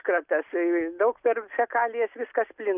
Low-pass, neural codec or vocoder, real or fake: 3.6 kHz; none; real